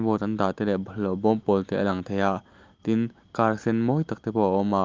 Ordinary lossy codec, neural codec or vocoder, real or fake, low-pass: Opus, 32 kbps; none; real; 7.2 kHz